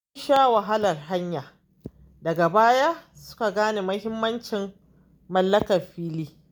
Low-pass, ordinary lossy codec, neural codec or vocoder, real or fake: 19.8 kHz; none; none; real